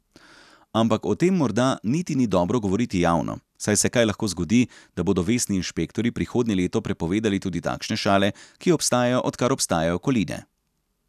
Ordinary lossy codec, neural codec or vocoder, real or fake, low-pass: none; none; real; 14.4 kHz